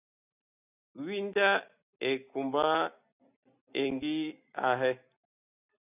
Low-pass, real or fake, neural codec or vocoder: 3.6 kHz; real; none